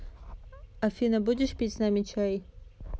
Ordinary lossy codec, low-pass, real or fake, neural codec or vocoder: none; none; real; none